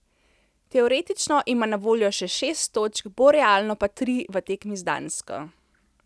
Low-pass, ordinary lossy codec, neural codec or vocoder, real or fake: none; none; none; real